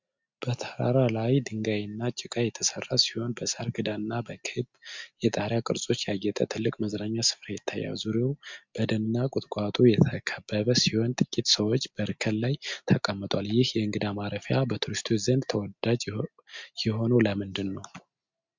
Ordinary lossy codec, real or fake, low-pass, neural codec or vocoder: MP3, 64 kbps; real; 7.2 kHz; none